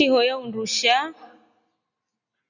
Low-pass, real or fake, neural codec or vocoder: 7.2 kHz; real; none